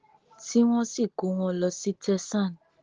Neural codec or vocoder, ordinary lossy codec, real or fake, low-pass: none; Opus, 16 kbps; real; 7.2 kHz